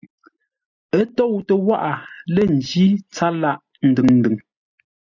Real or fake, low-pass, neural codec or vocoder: real; 7.2 kHz; none